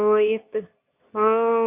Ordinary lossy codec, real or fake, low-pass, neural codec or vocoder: none; fake; 3.6 kHz; codec, 24 kHz, 0.9 kbps, WavTokenizer, medium speech release version 2